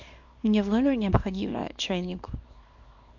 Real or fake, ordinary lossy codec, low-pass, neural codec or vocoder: fake; MP3, 48 kbps; 7.2 kHz; codec, 24 kHz, 0.9 kbps, WavTokenizer, small release